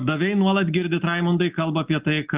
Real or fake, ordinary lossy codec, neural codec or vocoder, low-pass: real; Opus, 32 kbps; none; 3.6 kHz